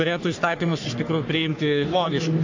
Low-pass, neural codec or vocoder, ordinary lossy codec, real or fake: 7.2 kHz; codec, 44.1 kHz, 3.4 kbps, Pupu-Codec; AAC, 48 kbps; fake